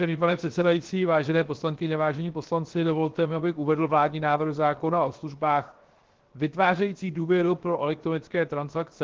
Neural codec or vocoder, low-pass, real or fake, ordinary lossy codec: codec, 16 kHz, 0.7 kbps, FocalCodec; 7.2 kHz; fake; Opus, 16 kbps